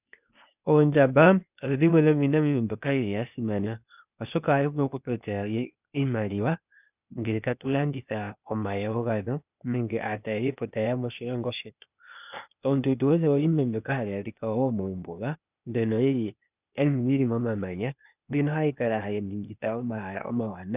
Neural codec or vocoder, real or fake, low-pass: codec, 16 kHz, 0.8 kbps, ZipCodec; fake; 3.6 kHz